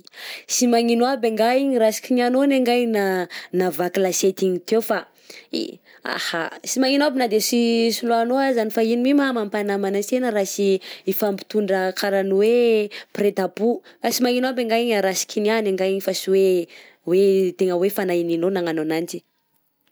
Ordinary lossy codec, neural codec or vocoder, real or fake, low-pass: none; none; real; none